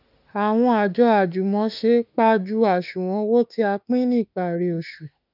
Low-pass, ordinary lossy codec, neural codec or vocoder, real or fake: 5.4 kHz; none; autoencoder, 48 kHz, 32 numbers a frame, DAC-VAE, trained on Japanese speech; fake